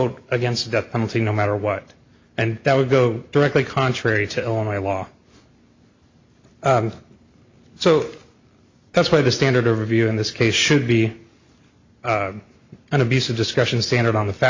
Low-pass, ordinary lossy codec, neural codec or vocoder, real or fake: 7.2 kHz; MP3, 48 kbps; none; real